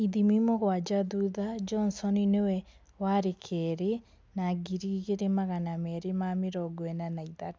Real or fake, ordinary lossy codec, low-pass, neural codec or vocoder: real; none; none; none